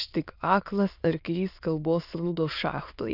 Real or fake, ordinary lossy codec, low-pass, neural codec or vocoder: fake; Opus, 64 kbps; 5.4 kHz; autoencoder, 22.05 kHz, a latent of 192 numbers a frame, VITS, trained on many speakers